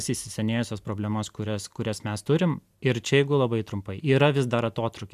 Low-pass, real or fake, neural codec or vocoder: 14.4 kHz; real; none